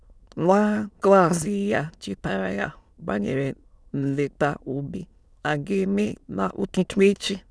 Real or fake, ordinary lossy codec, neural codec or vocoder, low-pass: fake; none; autoencoder, 22.05 kHz, a latent of 192 numbers a frame, VITS, trained on many speakers; none